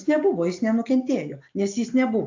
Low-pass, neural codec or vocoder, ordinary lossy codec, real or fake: 7.2 kHz; none; AAC, 48 kbps; real